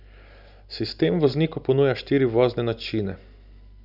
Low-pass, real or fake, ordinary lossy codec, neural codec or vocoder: 5.4 kHz; real; none; none